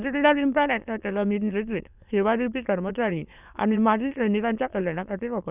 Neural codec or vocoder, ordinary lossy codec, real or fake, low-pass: autoencoder, 22.05 kHz, a latent of 192 numbers a frame, VITS, trained on many speakers; none; fake; 3.6 kHz